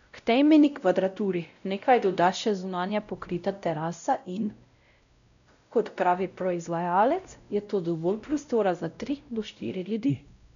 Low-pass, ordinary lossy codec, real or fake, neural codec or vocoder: 7.2 kHz; none; fake; codec, 16 kHz, 0.5 kbps, X-Codec, WavLM features, trained on Multilingual LibriSpeech